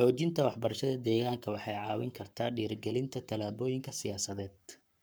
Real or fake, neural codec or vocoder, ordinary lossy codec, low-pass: fake; codec, 44.1 kHz, 7.8 kbps, Pupu-Codec; none; none